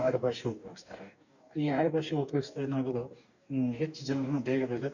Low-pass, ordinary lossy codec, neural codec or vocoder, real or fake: 7.2 kHz; none; codec, 44.1 kHz, 2.6 kbps, DAC; fake